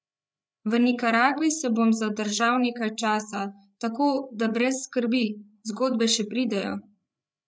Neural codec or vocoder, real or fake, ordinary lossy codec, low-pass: codec, 16 kHz, 8 kbps, FreqCodec, larger model; fake; none; none